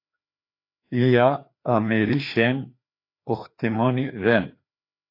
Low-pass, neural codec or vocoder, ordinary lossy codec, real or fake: 5.4 kHz; codec, 16 kHz, 2 kbps, FreqCodec, larger model; AAC, 32 kbps; fake